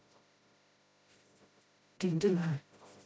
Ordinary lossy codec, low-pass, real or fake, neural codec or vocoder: none; none; fake; codec, 16 kHz, 0.5 kbps, FreqCodec, smaller model